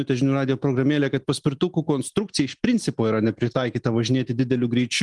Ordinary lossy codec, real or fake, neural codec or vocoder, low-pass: Opus, 24 kbps; real; none; 10.8 kHz